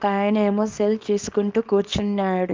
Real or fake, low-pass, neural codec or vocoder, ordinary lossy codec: fake; 7.2 kHz; codec, 24 kHz, 0.9 kbps, WavTokenizer, small release; Opus, 32 kbps